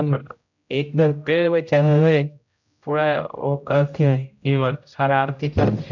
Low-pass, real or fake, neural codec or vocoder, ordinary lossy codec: 7.2 kHz; fake; codec, 16 kHz, 0.5 kbps, X-Codec, HuBERT features, trained on general audio; none